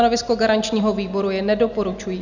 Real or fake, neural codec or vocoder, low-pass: real; none; 7.2 kHz